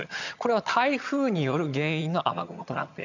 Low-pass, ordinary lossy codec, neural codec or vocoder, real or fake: 7.2 kHz; none; vocoder, 22.05 kHz, 80 mel bands, HiFi-GAN; fake